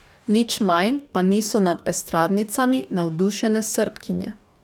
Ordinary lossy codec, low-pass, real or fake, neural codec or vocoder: none; 19.8 kHz; fake; codec, 44.1 kHz, 2.6 kbps, DAC